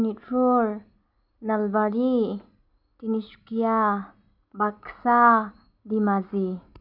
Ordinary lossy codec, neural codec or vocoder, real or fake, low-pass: none; none; real; 5.4 kHz